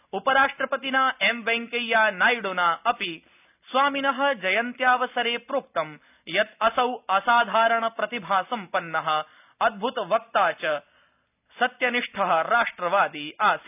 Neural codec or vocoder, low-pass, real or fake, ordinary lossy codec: none; 3.6 kHz; real; none